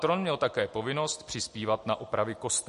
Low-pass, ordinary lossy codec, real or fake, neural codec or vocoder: 14.4 kHz; MP3, 48 kbps; real; none